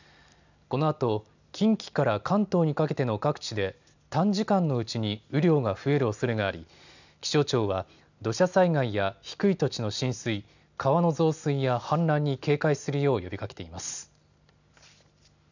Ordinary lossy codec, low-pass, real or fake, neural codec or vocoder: none; 7.2 kHz; real; none